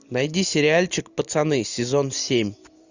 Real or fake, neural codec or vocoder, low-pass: real; none; 7.2 kHz